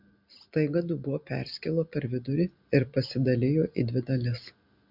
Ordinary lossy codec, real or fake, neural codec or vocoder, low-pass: MP3, 48 kbps; real; none; 5.4 kHz